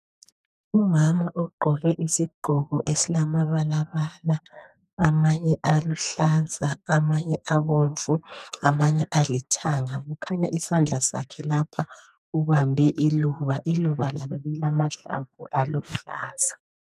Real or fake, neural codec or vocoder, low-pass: fake; codec, 44.1 kHz, 2.6 kbps, SNAC; 14.4 kHz